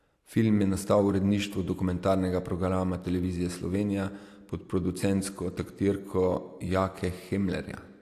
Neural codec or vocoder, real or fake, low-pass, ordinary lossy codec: none; real; 14.4 kHz; AAC, 64 kbps